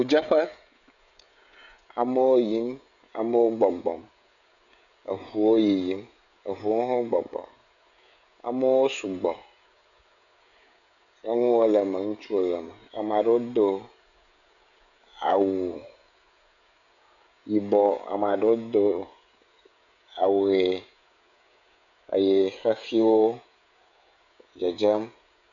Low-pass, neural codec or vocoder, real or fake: 7.2 kHz; codec, 16 kHz, 16 kbps, FreqCodec, smaller model; fake